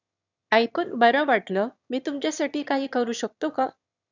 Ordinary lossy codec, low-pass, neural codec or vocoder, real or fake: none; 7.2 kHz; autoencoder, 22.05 kHz, a latent of 192 numbers a frame, VITS, trained on one speaker; fake